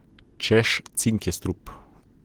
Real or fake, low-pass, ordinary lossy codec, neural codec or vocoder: real; 19.8 kHz; Opus, 16 kbps; none